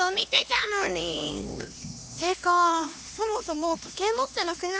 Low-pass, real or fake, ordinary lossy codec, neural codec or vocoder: none; fake; none; codec, 16 kHz, 2 kbps, X-Codec, HuBERT features, trained on LibriSpeech